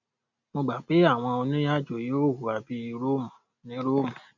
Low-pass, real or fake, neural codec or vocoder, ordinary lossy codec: 7.2 kHz; real; none; none